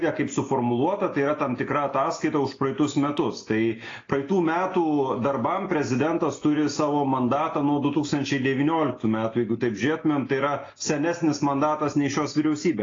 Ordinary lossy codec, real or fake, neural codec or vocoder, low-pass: AAC, 32 kbps; real; none; 7.2 kHz